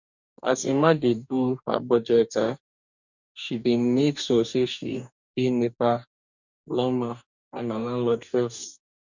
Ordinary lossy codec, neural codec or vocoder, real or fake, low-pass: none; codec, 44.1 kHz, 2.6 kbps, DAC; fake; 7.2 kHz